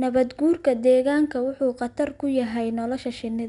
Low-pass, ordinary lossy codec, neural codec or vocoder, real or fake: 10.8 kHz; none; none; real